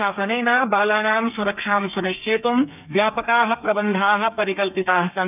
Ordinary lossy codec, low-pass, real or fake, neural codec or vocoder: none; 3.6 kHz; fake; codec, 32 kHz, 1.9 kbps, SNAC